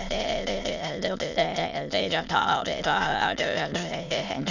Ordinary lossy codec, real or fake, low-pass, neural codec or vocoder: none; fake; 7.2 kHz; autoencoder, 22.05 kHz, a latent of 192 numbers a frame, VITS, trained on many speakers